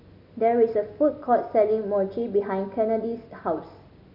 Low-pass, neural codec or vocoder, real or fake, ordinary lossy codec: 5.4 kHz; none; real; none